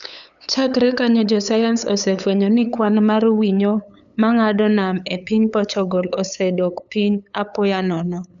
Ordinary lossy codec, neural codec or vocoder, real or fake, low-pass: none; codec, 16 kHz, 8 kbps, FunCodec, trained on LibriTTS, 25 frames a second; fake; 7.2 kHz